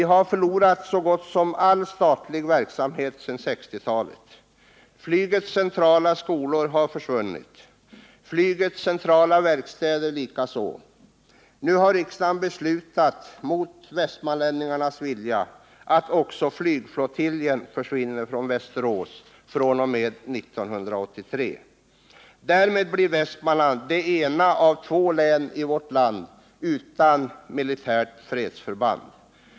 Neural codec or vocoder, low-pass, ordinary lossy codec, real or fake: none; none; none; real